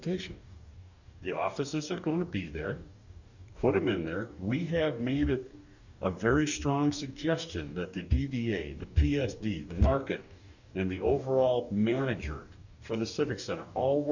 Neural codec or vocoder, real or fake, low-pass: codec, 44.1 kHz, 2.6 kbps, DAC; fake; 7.2 kHz